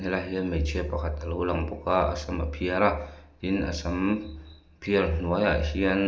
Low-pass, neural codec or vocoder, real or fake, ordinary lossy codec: none; none; real; none